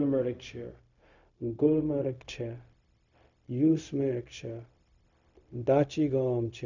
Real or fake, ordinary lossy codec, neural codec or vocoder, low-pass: fake; none; codec, 16 kHz, 0.4 kbps, LongCat-Audio-Codec; 7.2 kHz